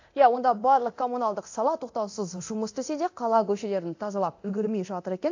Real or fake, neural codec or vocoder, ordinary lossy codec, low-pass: fake; codec, 24 kHz, 0.9 kbps, DualCodec; AAC, 48 kbps; 7.2 kHz